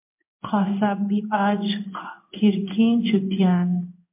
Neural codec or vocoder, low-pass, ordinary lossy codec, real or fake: codec, 16 kHz in and 24 kHz out, 1 kbps, XY-Tokenizer; 3.6 kHz; MP3, 32 kbps; fake